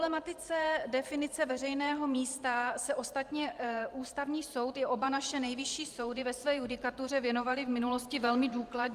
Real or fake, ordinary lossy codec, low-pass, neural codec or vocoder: fake; Opus, 32 kbps; 14.4 kHz; vocoder, 44.1 kHz, 128 mel bands every 512 samples, BigVGAN v2